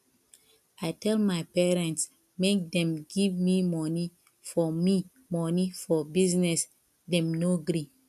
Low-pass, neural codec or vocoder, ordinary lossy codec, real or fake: 14.4 kHz; none; none; real